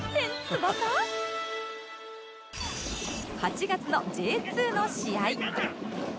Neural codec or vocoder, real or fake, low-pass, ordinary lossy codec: none; real; none; none